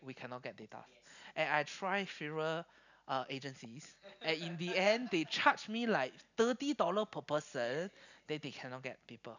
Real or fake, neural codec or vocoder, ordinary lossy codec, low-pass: real; none; none; 7.2 kHz